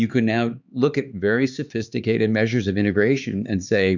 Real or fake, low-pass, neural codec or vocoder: fake; 7.2 kHz; codec, 16 kHz, 4 kbps, X-Codec, HuBERT features, trained on LibriSpeech